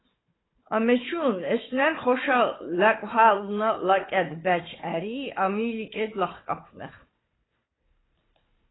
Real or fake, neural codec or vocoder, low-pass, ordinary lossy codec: fake; codec, 16 kHz, 4 kbps, FunCodec, trained on Chinese and English, 50 frames a second; 7.2 kHz; AAC, 16 kbps